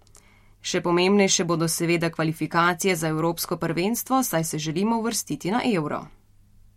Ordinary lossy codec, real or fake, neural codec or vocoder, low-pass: MP3, 64 kbps; real; none; 19.8 kHz